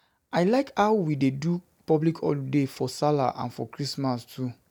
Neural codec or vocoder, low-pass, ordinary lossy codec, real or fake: none; none; none; real